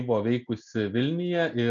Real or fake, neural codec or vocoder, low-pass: real; none; 7.2 kHz